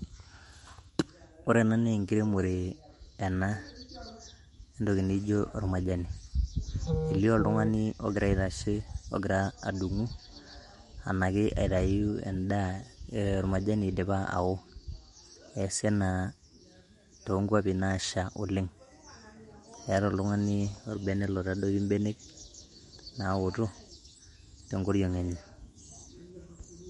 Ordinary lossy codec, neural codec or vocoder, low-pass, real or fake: MP3, 48 kbps; autoencoder, 48 kHz, 128 numbers a frame, DAC-VAE, trained on Japanese speech; 19.8 kHz; fake